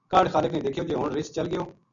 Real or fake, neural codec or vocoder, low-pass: real; none; 7.2 kHz